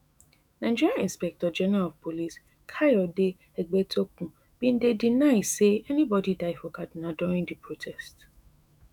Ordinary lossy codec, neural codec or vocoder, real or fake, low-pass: none; autoencoder, 48 kHz, 128 numbers a frame, DAC-VAE, trained on Japanese speech; fake; 19.8 kHz